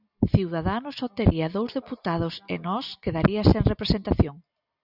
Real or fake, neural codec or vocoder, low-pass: real; none; 5.4 kHz